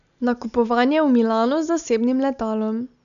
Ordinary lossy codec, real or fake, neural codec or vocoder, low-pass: none; real; none; 7.2 kHz